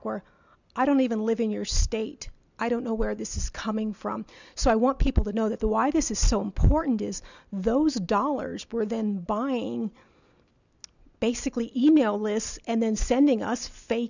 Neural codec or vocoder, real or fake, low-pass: none; real; 7.2 kHz